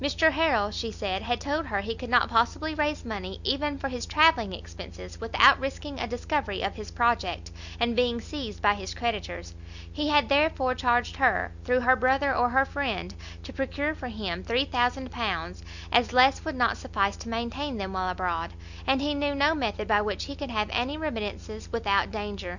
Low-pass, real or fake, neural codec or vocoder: 7.2 kHz; real; none